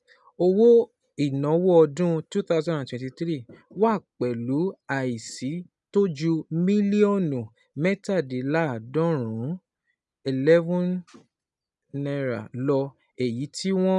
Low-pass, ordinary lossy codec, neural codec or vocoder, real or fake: 10.8 kHz; none; none; real